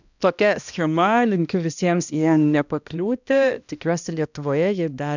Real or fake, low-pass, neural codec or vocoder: fake; 7.2 kHz; codec, 16 kHz, 1 kbps, X-Codec, HuBERT features, trained on balanced general audio